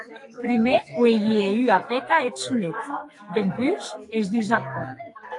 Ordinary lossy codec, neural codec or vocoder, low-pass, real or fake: AAC, 48 kbps; codec, 44.1 kHz, 2.6 kbps, SNAC; 10.8 kHz; fake